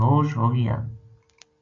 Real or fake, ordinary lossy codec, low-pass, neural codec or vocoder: real; AAC, 48 kbps; 7.2 kHz; none